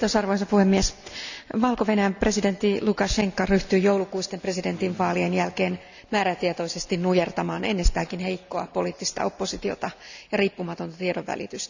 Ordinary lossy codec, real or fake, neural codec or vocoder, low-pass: none; real; none; 7.2 kHz